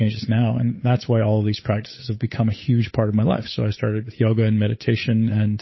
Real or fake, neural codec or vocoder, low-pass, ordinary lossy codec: fake; codec, 16 kHz, 8 kbps, FunCodec, trained on Chinese and English, 25 frames a second; 7.2 kHz; MP3, 24 kbps